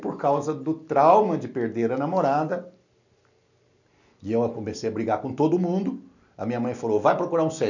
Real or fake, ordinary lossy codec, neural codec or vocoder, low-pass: real; none; none; 7.2 kHz